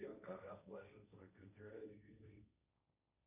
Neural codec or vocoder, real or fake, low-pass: codec, 16 kHz, 1.1 kbps, Voila-Tokenizer; fake; 3.6 kHz